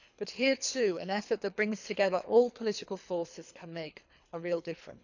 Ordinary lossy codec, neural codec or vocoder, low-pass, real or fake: none; codec, 24 kHz, 3 kbps, HILCodec; 7.2 kHz; fake